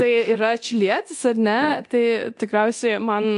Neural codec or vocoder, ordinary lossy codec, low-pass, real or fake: codec, 24 kHz, 0.9 kbps, DualCodec; AAC, 64 kbps; 10.8 kHz; fake